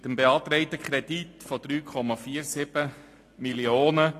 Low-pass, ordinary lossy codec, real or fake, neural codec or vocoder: 14.4 kHz; AAC, 48 kbps; real; none